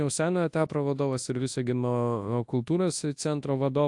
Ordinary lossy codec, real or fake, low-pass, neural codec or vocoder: AAC, 64 kbps; fake; 10.8 kHz; codec, 24 kHz, 0.9 kbps, WavTokenizer, large speech release